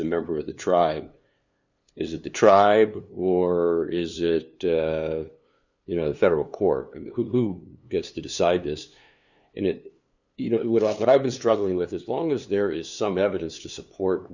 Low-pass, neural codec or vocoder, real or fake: 7.2 kHz; codec, 16 kHz, 2 kbps, FunCodec, trained on LibriTTS, 25 frames a second; fake